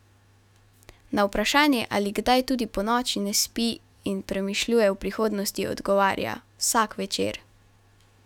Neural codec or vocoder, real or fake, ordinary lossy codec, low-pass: autoencoder, 48 kHz, 128 numbers a frame, DAC-VAE, trained on Japanese speech; fake; none; 19.8 kHz